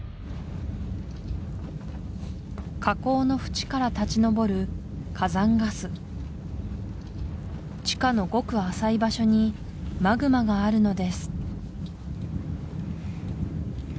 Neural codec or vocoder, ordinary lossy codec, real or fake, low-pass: none; none; real; none